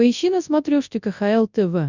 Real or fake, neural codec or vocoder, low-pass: fake; codec, 24 kHz, 0.9 kbps, WavTokenizer, large speech release; 7.2 kHz